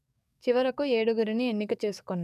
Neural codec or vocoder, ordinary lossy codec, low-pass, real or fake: codec, 44.1 kHz, 7.8 kbps, DAC; none; 14.4 kHz; fake